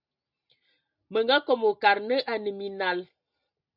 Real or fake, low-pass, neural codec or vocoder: real; 5.4 kHz; none